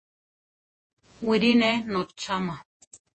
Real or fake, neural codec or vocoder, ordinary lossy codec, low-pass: fake; vocoder, 48 kHz, 128 mel bands, Vocos; MP3, 32 kbps; 10.8 kHz